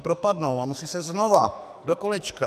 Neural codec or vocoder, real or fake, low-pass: codec, 44.1 kHz, 2.6 kbps, SNAC; fake; 14.4 kHz